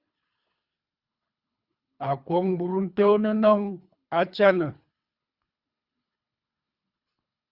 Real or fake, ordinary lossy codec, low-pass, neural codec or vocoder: fake; Opus, 64 kbps; 5.4 kHz; codec, 24 kHz, 3 kbps, HILCodec